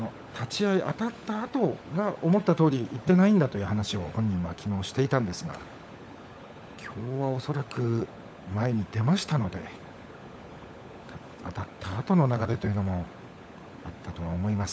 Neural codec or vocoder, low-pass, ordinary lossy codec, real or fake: codec, 16 kHz, 16 kbps, FunCodec, trained on LibriTTS, 50 frames a second; none; none; fake